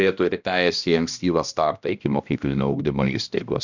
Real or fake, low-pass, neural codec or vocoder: fake; 7.2 kHz; codec, 16 kHz, 1 kbps, X-Codec, HuBERT features, trained on balanced general audio